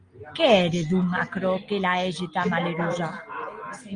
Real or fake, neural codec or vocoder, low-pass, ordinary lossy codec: real; none; 9.9 kHz; Opus, 32 kbps